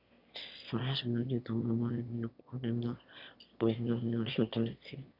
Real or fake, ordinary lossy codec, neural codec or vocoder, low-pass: fake; none; autoencoder, 22.05 kHz, a latent of 192 numbers a frame, VITS, trained on one speaker; 5.4 kHz